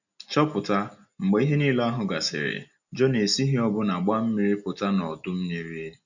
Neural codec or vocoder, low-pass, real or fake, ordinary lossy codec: none; 7.2 kHz; real; none